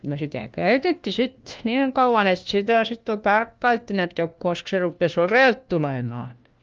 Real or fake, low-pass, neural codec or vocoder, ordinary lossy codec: fake; 7.2 kHz; codec, 16 kHz, 1 kbps, FunCodec, trained on LibriTTS, 50 frames a second; Opus, 24 kbps